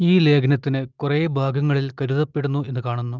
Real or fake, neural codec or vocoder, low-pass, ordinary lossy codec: real; none; 7.2 kHz; Opus, 24 kbps